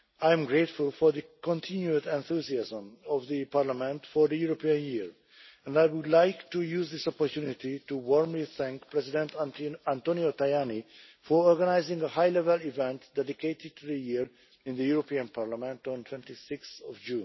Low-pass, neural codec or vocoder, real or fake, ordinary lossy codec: 7.2 kHz; none; real; MP3, 24 kbps